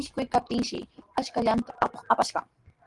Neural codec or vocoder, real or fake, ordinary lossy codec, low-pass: none; real; Opus, 24 kbps; 10.8 kHz